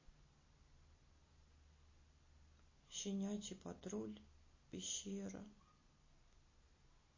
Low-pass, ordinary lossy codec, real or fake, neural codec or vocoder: 7.2 kHz; MP3, 32 kbps; real; none